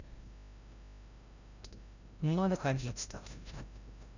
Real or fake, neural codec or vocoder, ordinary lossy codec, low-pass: fake; codec, 16 kHz, 0.5 kbps, FreqCodec, larger model; none; 7.2 kHz